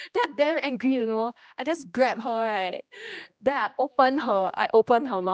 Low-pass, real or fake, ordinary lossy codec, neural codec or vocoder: none; fake; none; codec, 16 kHz, 1 kbps, X-Codec, HuBERT features, trained on general audio